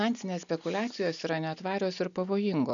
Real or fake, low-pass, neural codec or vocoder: real; 7.2 kHz; none